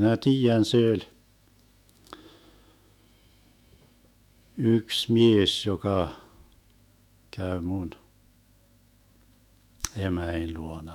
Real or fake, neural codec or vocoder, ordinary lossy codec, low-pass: fake; autoencoder, 48 kHz, 128 numbers a frame, DAC-VAE, trained on Japanese speech; none; 19.8 kHz